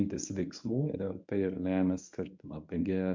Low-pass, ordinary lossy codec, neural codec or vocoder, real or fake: 7.2 kHz; MP3, 64 kbps; codec, 24 kHz, 0.9 kbps, WavTokenizer, medium speech release version 1; fake